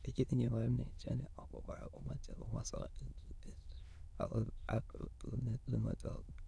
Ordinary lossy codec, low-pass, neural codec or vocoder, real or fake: none; none; autoencoder, 22.05 kHz, a latent of 192 numbers a frame, VITS, trained on many speakers; fake